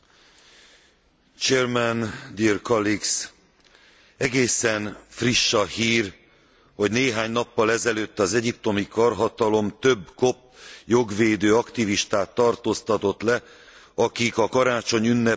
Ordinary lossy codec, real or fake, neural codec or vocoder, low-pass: none; real; none; none